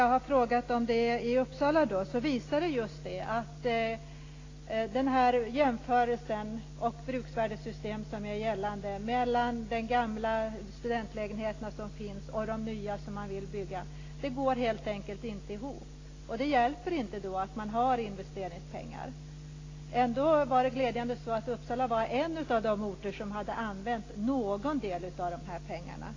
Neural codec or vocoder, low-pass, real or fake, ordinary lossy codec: none; 7.2 kHz; real; AAC, 32 kbps